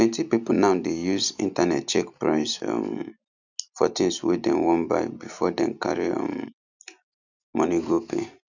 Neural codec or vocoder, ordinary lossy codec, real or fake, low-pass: none; none; real; 7.2 kHz